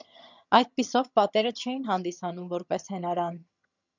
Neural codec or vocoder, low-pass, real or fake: vocoder, 22.05 kHz, 80 mel bands, HiFi-GAN; 7.2 kHz; fake